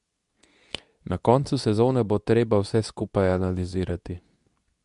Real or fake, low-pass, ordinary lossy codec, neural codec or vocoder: fake; 10.8 kHz; none; codec, 24 kHz, 0.9 kbps, WavTokenizer, medium speech release version 2